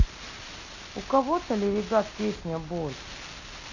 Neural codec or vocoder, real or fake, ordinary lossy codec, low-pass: none; real; none; 7.2 kHz